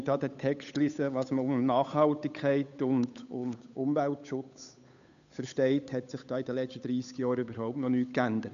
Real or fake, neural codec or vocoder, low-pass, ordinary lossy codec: fake; codec, 16 kHz, 8 kbps, FunCodec, trained on Chinese and English, 25 frames a second; 7.2 kHz; MP3, 96 kbps